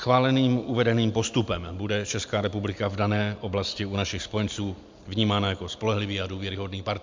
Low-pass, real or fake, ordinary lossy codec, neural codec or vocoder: 7.2 kHz; real; MP3, 64 kbps; none